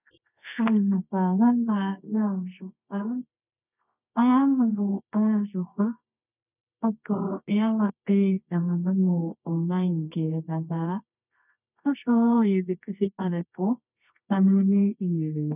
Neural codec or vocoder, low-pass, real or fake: codec, 24 kHz, 0.9 kbps, WavTokenizer, medium music audio release; 3.6 kHz; fake